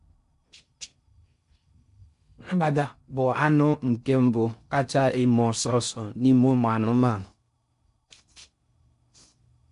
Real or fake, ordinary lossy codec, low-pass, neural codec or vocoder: fake; MP3, 64 kbps; 10.8 kHz; codec, 16 kHz in and 24 kHz out, 0.6 kbps, FocalCodec, streaming, 4096 codes